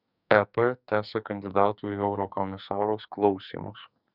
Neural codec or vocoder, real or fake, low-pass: codec, 44.1 kHz, 2.6 kbps, SNAC; fake; 5.4 kHz